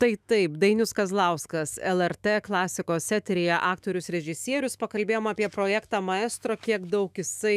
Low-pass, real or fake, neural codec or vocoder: 14.4 kHz; fake; autoencoder, 48 kHz, 128 numbers a frame, DAC-VAE, trained on Japanese speech